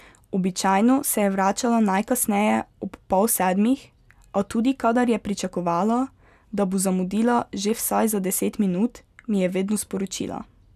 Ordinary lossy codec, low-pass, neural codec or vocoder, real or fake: none; 14.4 kHz; none; real